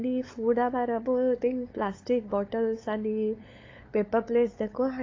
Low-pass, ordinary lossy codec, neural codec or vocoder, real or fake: 7.2 kHz; AAC, 32 kbps; codec, 16 kHz, 8 kbps, FunCodec, trained on LibriTTS, 25 frames a second; fake